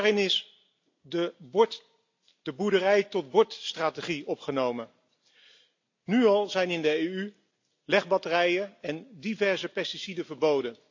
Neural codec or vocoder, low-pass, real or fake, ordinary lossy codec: none; 7.2 kHz; real; AAC, 48 kbps